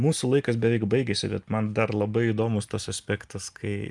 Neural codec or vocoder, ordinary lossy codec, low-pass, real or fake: none; Opus, 32 kbps; 10.8 kHz; real